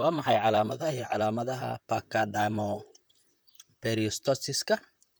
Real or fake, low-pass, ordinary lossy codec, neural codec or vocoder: fake; none; none; vocoder, 44.1 kHz, 128 mel bands, Pupu-Vocoder